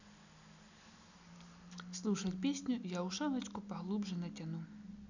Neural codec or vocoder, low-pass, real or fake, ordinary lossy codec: none; 7.2 kHz; real; none